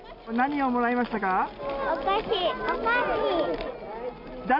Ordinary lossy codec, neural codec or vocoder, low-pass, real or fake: none; none; 5.4 kHz; real